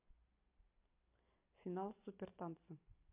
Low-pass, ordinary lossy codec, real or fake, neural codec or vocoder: 3.6 kHz; MP3, 32 kbps; real; none